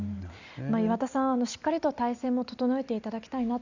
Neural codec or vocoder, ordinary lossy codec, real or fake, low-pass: none; Opus, 64 kbps; real; 7.2 kHz